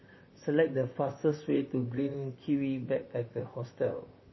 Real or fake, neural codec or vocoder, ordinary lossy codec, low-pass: fake; vocoder, 44.1 kHz, 128 mel bands, Pupu-Vocoder; MP3, 24 kbps; 7.2 kHz